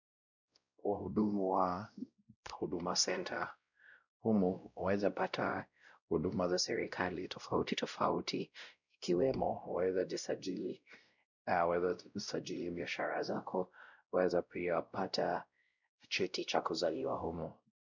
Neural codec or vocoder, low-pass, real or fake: codec, 16 kHz, 0.5 kbps, X-Codec, WavLM features, trained on Multilingual LibriSpeech; 7.2 kHz; fake